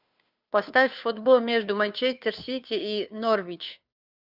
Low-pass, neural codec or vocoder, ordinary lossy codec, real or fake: 5.4 kHz; codec, 16 kHz, 2 kbps, FunCodec, trained on Chinese and English, 25 frames a second; Opus, 64 kbps; fake